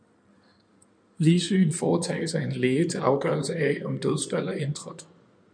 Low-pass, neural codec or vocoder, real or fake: 9.9 kHz; codec, 16 kHz in and 24 kHz out, 2.2 kbps, FireRedTTS-2 codec; fake